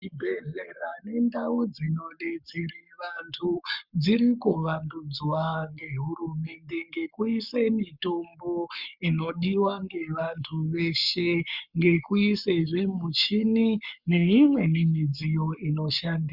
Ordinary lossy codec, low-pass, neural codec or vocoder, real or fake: Opus, 64 kbps; 5.4 kHz; codec, 44.1 kHz, 7.8 kbps, Pupu-Codec; fake